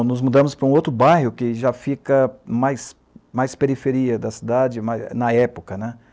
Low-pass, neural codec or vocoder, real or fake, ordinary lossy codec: none; none; real; none